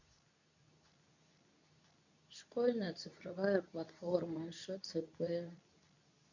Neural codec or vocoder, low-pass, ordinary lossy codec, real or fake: codec, 24 kHz, 0.9 kbps, WavTokenizer, medium speech release version 2; 7.2 kHz; none; fake